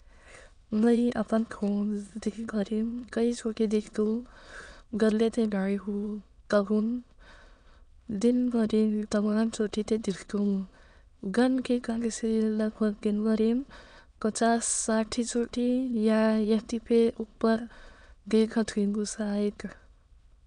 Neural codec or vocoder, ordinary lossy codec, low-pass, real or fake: autoencoder, 22.05 kHz, a latent of 192 numbers a frame, VITS, trained on many speakers; none; 9.9 kHz; fake